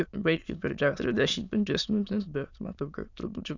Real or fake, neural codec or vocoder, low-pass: fake; autoencoder, 22.05 kHz, a latent of 192 numbers a frame, VITS, trained on many speakers; 7.2 kHz